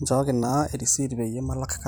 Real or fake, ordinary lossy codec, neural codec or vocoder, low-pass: fake; none; vocoder, 44.1 kHz, 128 mel bands every 256 samples, BigVGAN v2; none